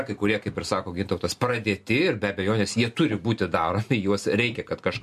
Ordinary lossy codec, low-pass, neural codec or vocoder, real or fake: MP3, 64 kbps; 14.4 kHz; none; real